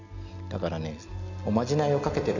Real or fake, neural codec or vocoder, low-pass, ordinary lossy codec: real; none; 7.2 kHz; none